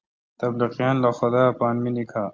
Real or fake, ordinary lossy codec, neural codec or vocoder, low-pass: real; Opus, 32 kbps; none; 7.2 kHz